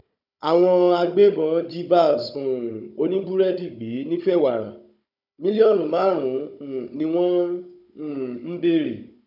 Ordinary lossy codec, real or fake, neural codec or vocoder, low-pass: none; fake; codec, 16 kHz, 16 kbps, FunCodec, trained on Chinese and English, 50 frames a second; 5.4 kHz